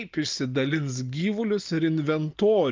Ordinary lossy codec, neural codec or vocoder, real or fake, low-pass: Opus, 24 kbps; none; real; 7.2 kHz